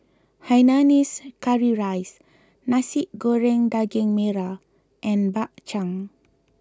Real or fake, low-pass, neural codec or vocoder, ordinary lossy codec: real; none; none; none